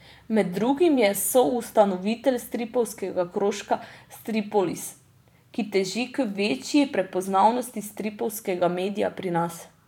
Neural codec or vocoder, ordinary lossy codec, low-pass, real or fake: vocoder, 44.1 kHz, 128 mel bands every 512 samples, BigVGAN v2; none; 19.8 kHz; fake